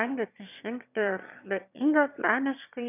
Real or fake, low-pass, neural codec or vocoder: fake; 3.6 kHz; autoencoder, 22.05 kHz, a latent of 192 numbers a frame, VITS, trained on one speaker